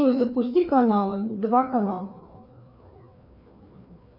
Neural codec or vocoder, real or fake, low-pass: codec, 16 kHz, 2 kbps, FreqCodec, larger model; fake; 5.4 kHz